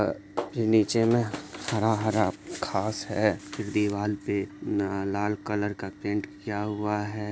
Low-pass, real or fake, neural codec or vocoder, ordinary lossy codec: none; real; none; none